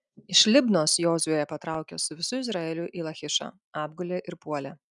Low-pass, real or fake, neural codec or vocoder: 10.8 kHz; real; none